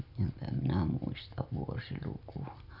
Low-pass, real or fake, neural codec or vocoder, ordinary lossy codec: 5.4 kHz; real; none; none